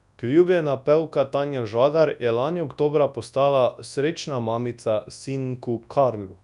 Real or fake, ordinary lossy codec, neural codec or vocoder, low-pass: fake; none; codec, 24 kHz, 0.9 kbps, WavTokenizer, large speech release; 10.8 kHz